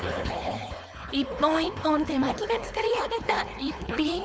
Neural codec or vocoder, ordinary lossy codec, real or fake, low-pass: codec, 16 kHz, 4.8 kbps, FACodec; none; fake; none